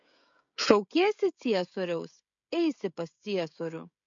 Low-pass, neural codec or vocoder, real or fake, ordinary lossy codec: 7.2 kHz; codec, 16 kHz, 16 kbps, FreqCodec, smaller model; fake; MP3, 48 kbps